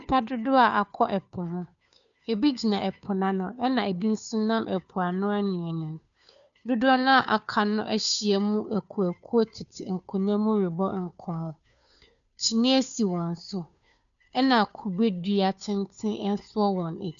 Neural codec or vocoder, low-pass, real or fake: codec, 16 kHz, 2 kbps, FunCodec, trained on Chinese and English, 25 frames a second; 7.2 kHz; fake